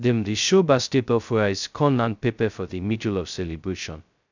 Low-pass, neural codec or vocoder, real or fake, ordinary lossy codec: 7.2 kHz; codec, 16 kHz, 0.2 kbps, FocalCodec; fake; none